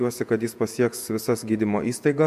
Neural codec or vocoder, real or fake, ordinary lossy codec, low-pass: vocoder, 48 kHz, 128 mel bands, Vocos; fake; MP3, 96 kbps; 14.4 kHz